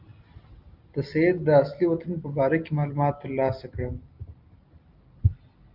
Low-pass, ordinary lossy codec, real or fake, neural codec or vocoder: 5.4 kHz; Opus, 32 kbps; real; none